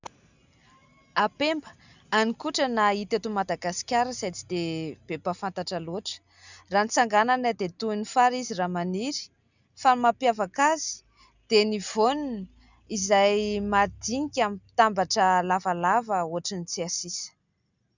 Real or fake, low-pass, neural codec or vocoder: real; 7.2 kHz; none